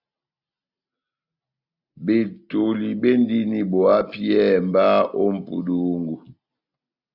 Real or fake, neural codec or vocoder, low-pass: real; none; 5.4 kHz